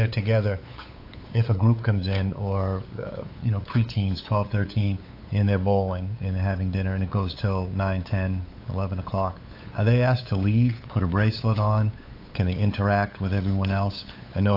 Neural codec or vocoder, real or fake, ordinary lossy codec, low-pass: codec, 16 kHz, 8 kbps, FunCodec, trained on LibriTTS, 25 frames a second; fake; AAC, 32 kbps; 5.4 kHz